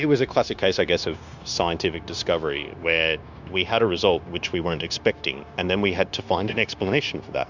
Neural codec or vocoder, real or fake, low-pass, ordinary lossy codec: codec, 16 kHz, 0.9 kbps, LongCat-Audio-Codec; fake; 7.2 kHz; Opus, 64 kbps